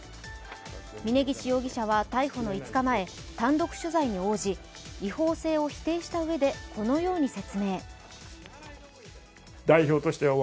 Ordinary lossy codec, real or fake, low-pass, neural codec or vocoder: none; real; none; none